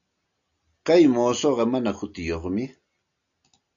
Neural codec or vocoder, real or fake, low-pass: none; real; 7.2 kHz